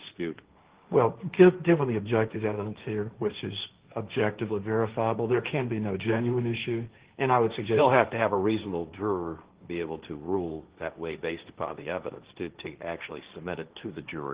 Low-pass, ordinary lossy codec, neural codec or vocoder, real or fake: 3.6 kHz; Opus, 16 kbps; codec, 16 kHz, 1.1 kbps, Voila-Tokenizer; fake